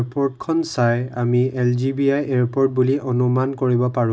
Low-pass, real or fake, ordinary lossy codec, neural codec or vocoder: none; real; none; none